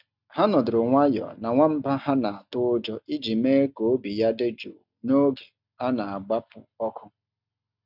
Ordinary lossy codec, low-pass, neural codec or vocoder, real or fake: none; 5.4 kHz; none; real